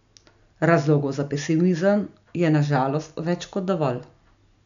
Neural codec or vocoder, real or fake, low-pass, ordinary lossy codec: none; real; 7.2 kHz; none